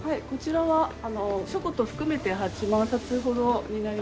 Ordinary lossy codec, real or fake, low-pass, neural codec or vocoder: none; real; none; none